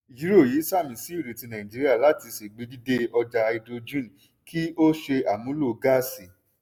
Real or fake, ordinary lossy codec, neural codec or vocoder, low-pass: real; none; none; none